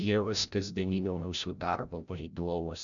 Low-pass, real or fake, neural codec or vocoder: 7.2 kHz; fake; codec, 16 kHz, 0.5 kbps, FreqCodec, larger model